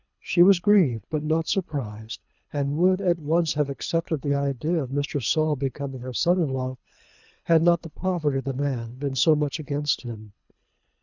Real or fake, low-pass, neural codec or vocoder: fake; 7.2 kHz; codec, 24 kHz, 3 kbps, HILCodec